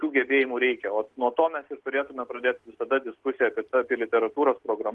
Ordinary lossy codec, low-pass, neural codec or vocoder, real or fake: Opus, 16 kbps; 10.8 kHz; none; real